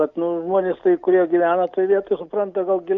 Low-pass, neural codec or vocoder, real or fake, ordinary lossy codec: 7.2 kHz; none; real; Opus, 64 kbps